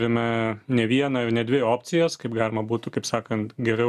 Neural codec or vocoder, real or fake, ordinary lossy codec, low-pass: none; real; MP3, 96 kbps; 14.4 kHz